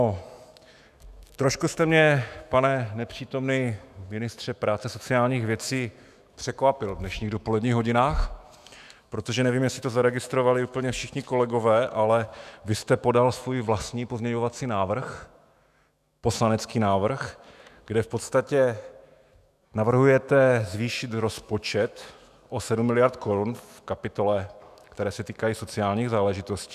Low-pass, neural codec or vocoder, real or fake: 14.4 kHz; autoencoder, 48 kHz, 128 numbers a frame, DAC-VAE, trained on Japanese speech; fake